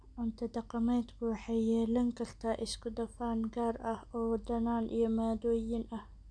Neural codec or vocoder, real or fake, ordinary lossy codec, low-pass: none; real; none; 9.9 kHz